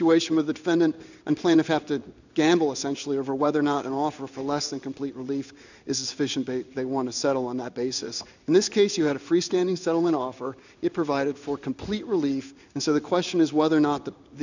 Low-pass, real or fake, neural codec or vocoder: 7.2 kHz; fake; codec, 16 kHz in and 24 kHz out, 1 kbps, XY-Tokenizer